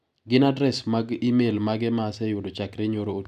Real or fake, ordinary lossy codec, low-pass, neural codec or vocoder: real; none; 14.4 kHz; none